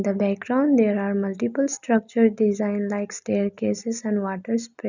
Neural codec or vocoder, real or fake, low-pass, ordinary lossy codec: none; real; 7.2 kHz; none